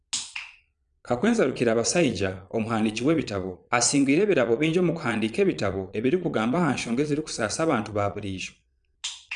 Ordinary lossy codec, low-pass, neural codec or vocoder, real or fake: none; 9.9 kHz; vocoder, 22.05 kHz, 80 mel bands, Vocos; fake